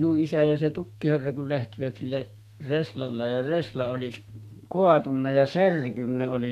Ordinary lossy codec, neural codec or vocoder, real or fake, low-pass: none; codec, 32 kHz, 1.9 kbps, SNAC; fake; 14.4 kHz